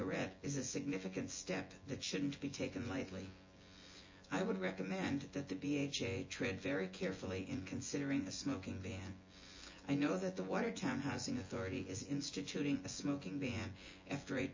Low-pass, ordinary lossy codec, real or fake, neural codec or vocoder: 7.2 kHz; MP3, 32 kbps; fake; vocoder, 24 kHz, 100 mel bands, Vocos